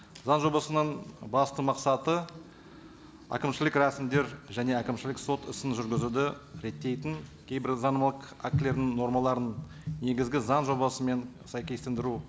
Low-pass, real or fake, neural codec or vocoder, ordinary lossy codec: none; real; none; none